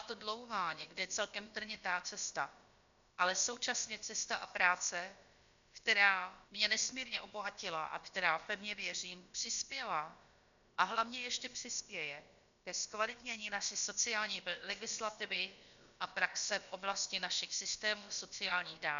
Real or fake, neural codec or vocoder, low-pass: fake; codec, 16 kHz, about 1 kbps, DyCAST, with the encoder's durations; 7.2 kHz